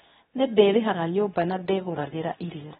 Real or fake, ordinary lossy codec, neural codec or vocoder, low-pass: fake; AAC, 16 kbps; codec, 24 kHz, 0.9 kbps, WavTokenizer, medium speech release version 2; 10.8 kHz